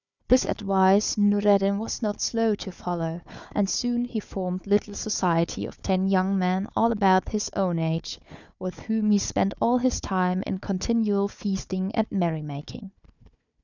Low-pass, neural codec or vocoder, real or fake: 7.2 kHz; codec, 16 kHz, 4 kbps, FunCodec, trained on Chinese and English, 50 frames a second; fake